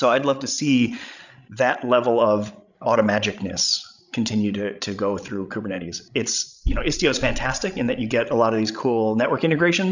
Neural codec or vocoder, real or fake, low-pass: codec, 16 kHz, 16 kbps, FreqCodec, larger model; fake; 7.2 kHz